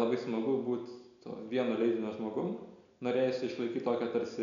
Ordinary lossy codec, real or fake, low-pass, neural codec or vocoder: MP3, 96 kbps; real; 7.2 kHz; none